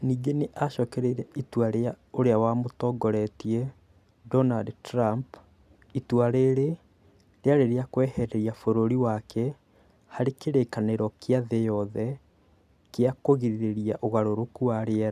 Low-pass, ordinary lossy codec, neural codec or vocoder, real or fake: 14.4 kHz; none; none; real